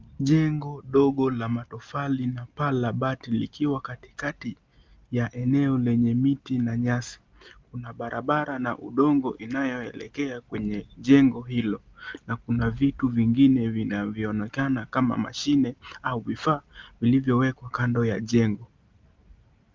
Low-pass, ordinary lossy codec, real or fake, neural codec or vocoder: 7.2 kHz; Opus, 32 kbps; real; none